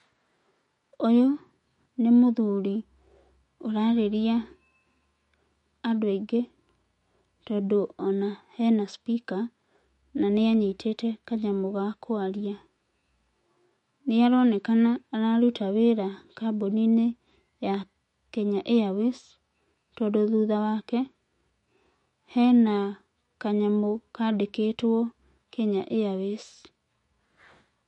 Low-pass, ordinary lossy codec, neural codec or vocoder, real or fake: 19.8 kHz; MP3, 48 kbps; autoencoder, 48 kHz, 128 numbers a frame, DAC-VAE, trained on Japanese speech; fake